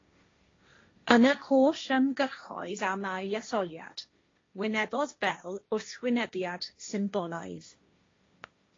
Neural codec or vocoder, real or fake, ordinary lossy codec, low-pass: codec, 16 kHz, 1.1 kbps, Voila-Tokenizer; fake; AAC, 32 kbps; 7.2 kHz